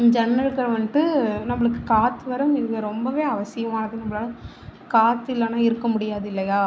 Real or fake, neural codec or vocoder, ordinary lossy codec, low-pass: real; none; none; none